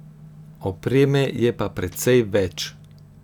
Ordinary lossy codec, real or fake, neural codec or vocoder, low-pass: none; real; none; 19.8 kHz